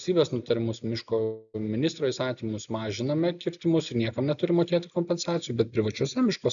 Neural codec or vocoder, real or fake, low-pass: none; real; 7.2 kHz